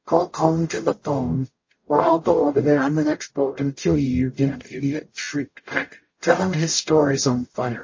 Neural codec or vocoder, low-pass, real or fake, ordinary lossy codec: codec, 44.1 kHz, 0.9 kbps, DAC; 7.2 kHz; fake; MP3, 32 kbps